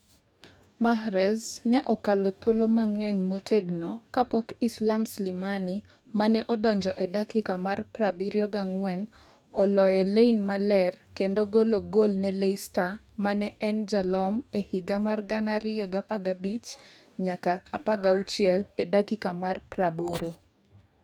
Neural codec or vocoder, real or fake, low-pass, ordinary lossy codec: codec, 44.1 kHz, 2.6 kbps, DAC; fake; 19.8 kHz; none